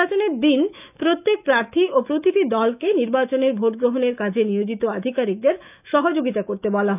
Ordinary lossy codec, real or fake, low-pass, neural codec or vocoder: none; fake; 3.6 kHz; autoencoder, 48 kHz, 128 numbers a frame, DAC-VAE, trained on Japanese speech